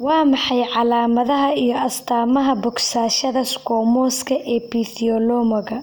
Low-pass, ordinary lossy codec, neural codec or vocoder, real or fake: none; none; none; real